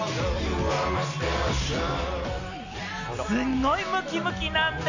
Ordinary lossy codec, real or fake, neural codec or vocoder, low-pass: AAC, 48 kbps; real; none; 7.2 kHz